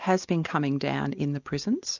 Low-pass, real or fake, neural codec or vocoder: 7.2 kHz; real; none